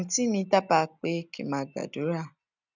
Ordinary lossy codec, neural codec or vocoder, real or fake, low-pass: none; none; real; 7.2 kHz